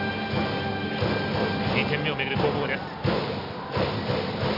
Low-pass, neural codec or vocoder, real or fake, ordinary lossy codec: 5.4 kHz; none; real; none